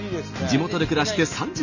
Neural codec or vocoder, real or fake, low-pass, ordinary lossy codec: none; real; 7.2 kHz; none